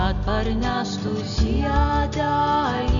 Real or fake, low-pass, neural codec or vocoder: real; 7.2 kHz; none